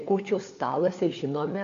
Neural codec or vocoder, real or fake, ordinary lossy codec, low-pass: codec, 16 kHz, 4 kbps, FunCodec, trained on LibriTTS, 50 frames a second; fake; AAC, 48 kbps; 7.2 kHz